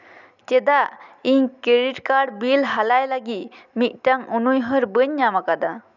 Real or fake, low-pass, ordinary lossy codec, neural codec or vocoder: real; 7.2 kHz; none; none